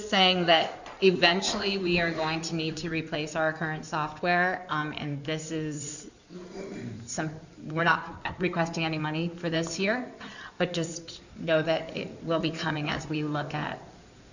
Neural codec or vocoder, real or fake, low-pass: codec, 16 kHz in and 24 kHz out, 2.2 kbps, FireRedTTS-2 codec; fake; 7.2 kHz